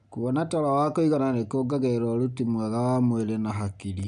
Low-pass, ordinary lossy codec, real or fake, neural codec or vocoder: 9.9 kHz; none; real; none